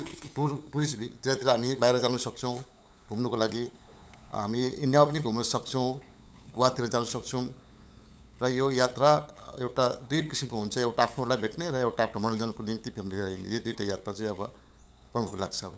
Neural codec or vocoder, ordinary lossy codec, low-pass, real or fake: codec, 16 kHz, 8 kbps, FunCodec, trained on LibriTTS, 25 frames a second; none; none; fake